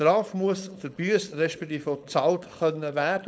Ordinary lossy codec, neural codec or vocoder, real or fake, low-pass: none; codec, 16 kHz, 4.8 kbps, FACodec; fake; none